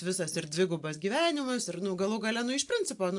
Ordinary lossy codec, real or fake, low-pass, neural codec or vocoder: AAC, 64 kbps; real; 10.8 kHz; none